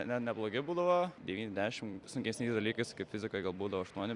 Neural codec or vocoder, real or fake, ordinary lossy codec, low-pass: vocoder, 44.1 kHz, 128 mel bands every 256 samples, BigVGAN v2; fake; Opus, 64 kbps; 10.8 kHz